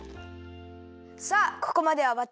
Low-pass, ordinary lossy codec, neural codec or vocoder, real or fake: none; none; none; real